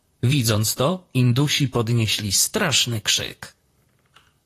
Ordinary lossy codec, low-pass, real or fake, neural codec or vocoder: AAC, 48 kbps; 14.4 kHz; fake; vocoder, 44.1 kHz, 128 mel bands, Pupu-Vocoder